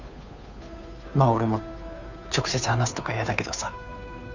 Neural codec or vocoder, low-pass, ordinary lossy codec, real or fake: codec, 16 kHz, 2 kbps, FunCodec, trained on Chinese and English, 25 frames a second; 7.2 kHz; none; fake